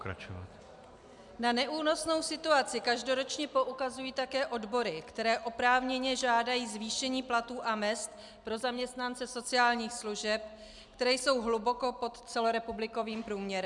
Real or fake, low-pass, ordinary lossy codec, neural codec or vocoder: real; 10.8 kHz; MP3, 96 kbps; none